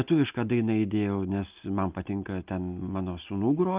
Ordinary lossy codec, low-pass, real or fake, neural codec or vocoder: Opus, 32 kbps; 3.6 kHz; real; none